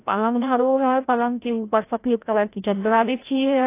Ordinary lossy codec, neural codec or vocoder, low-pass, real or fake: AAC, 24 kbps; codec, 16 kHz, 0.5 kbps, FreqCodec, larger model; 3.6 kHz; fake